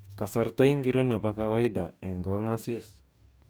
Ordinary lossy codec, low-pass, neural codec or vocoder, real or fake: none; none; codec, 44.1 kHz, 2.6 kbps, DAC; fake